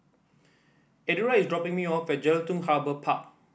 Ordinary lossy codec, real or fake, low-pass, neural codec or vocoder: none; real; none; none